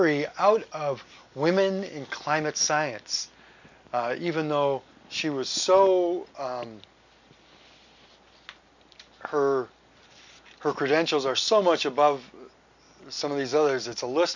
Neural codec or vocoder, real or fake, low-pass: none; real; 7.2 kHz